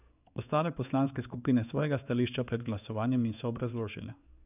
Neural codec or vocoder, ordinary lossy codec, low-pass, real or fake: codec, 16 kHz in and 24 kHz out, 1 kbps, XY-Tokenizer; none; 3.6 kHz; fake